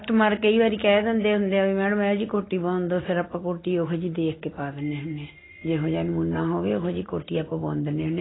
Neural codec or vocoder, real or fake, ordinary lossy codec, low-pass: none; real; AAC, 16 kbps; 7.2 kHz